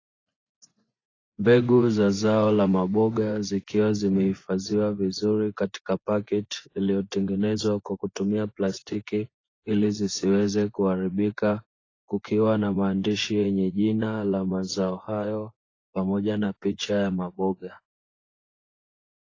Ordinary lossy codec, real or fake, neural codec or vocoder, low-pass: AAC, 32 kbps; fake; vocoder, 24 kHz, 100 mel bands, Vocos; 7.2 kHz